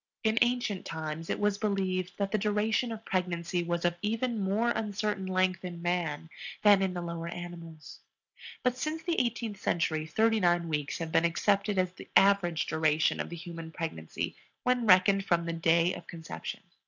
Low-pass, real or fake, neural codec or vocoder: 7.2 kHz; real; none